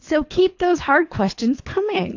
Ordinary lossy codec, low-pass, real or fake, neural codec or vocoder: AAC, 48 kbps; 7.2 kHz; fake; codec, 24 kHz, 3 kbps, HILCodec